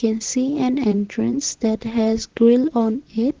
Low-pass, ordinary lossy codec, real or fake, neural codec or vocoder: 7.2 kHz; Opus, 16 kbps; fake; vocoder, 44.1 kHz, 128 mel bands, Pupu-Vocoder